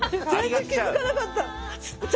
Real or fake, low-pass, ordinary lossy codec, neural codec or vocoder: real; none; none; none